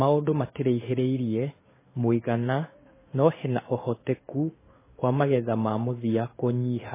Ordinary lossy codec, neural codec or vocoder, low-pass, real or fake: MP3, 16 kbps; codec, 16 kHz in and 24 kHz out, 1 kbps, XY-Tokenizer; 3.6 kHz; fake